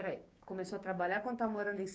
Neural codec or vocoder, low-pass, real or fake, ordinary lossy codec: codec, 16 kHz, 6 kbps, DAC; none; fake; none